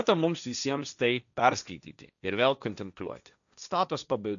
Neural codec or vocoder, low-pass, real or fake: codec, 16 kHz, 1.1 kbps, Voila-Tokenizer; 7.2 kHz; fake